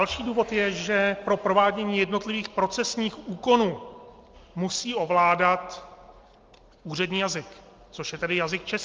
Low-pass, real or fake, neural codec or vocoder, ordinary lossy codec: 7.2 kHz; real; none; Opus, 32 kbps